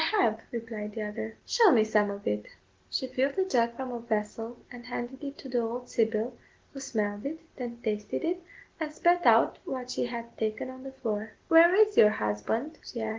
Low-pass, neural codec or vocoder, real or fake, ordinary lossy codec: 7.2 kHz; none; real; Opus, 16 kbps